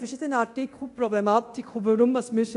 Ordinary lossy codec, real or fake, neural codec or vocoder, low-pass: AAC, 64 kbps; fake; codec, 24 kHz, 0.9 kbps, DualCodec; 10.8 kHz